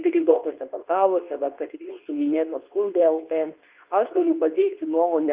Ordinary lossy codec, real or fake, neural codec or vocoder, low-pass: Opus, 32 kbps; fake; codec, 24 kHz, 0.9 kbps, WavTokenizer, medium speech release version 2; 3.6 kHz